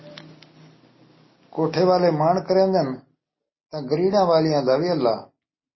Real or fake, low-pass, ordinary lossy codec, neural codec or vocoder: real; 7.2 kHz; MP3, 24 kbps; none